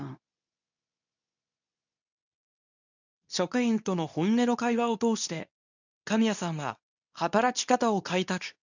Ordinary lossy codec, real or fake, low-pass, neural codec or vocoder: none; fake; 7.2 kHz; codec, 24 kHz, 0.9 kbps, WavTokenizer, medium speech release version 2